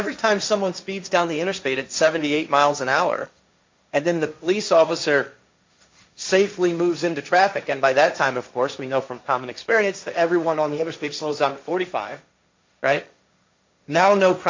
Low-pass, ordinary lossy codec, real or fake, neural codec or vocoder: 7.2 kHz; MP3, 64 kbps; fake; codec, 16 kHz, 1.1 kbps, Voila-Tokenizer